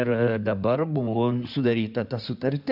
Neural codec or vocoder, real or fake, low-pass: codec, 16 kHz in and 24 kHz out, 2.2 kbps, FireRedTTS-2 codec; fake; 5.4 kHz